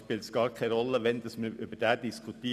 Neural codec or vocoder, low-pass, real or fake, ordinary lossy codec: none; 14.4 kHz; real; none